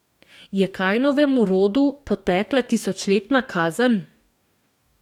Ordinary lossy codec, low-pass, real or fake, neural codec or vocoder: none; 19.8 kHz; fake; codec, 44.1 kHz, 2.6 kbps, DAC